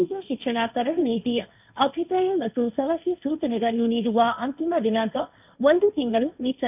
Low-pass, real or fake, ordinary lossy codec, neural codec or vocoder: 3.6 kHz; fake; none; codec, 16 kHz, 1.1 kbps, Voila-Tokenizer